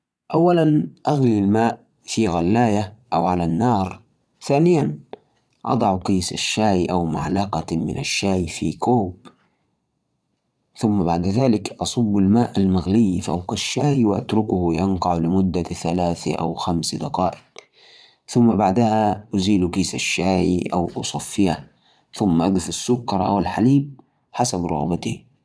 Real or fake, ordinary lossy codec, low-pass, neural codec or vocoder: fake; none; none; vocoder, 22.05 kHz, 80 mel bands, Vocos